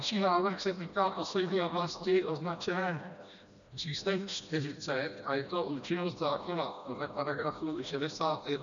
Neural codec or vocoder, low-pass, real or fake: codec, 16 kHz, 1 kbps, FreqCodec, smaller model; 7.2 kHz; fake